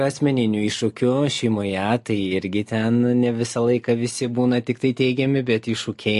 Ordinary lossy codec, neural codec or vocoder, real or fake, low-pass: MP3, 48 kbps; none; real; 14.4 kHz